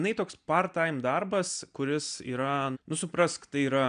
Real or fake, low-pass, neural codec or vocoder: real; 9.9 kHz; none